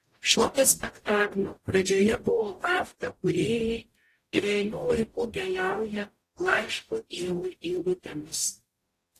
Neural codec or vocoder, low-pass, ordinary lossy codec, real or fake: codec, 44.1 kHz, 0.9 kbps, DAC; 14.4 kHz; AAC, 48 kbps; fake